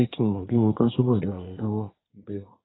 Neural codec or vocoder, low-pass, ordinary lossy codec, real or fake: codec, 24 kHz, 1 kbps, SNAC; 7.2 kHz; AAC, 16 kbps; fake